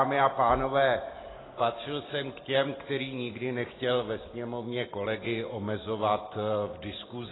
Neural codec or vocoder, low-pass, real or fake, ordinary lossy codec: none; 7.2 kHz; real; AAC, 16 kbps